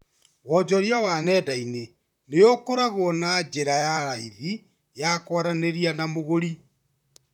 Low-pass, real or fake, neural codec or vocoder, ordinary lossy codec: 19.8 kHz; fake; vocoder, 44.1 kHz, 128 mel bands, Pupu-Vocoder; none